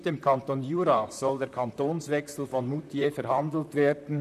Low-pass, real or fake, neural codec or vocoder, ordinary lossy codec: 14.4 kHz; fake; vocoder, 44.1 kHz, 128 mel bands, Pupu-Vocoder; none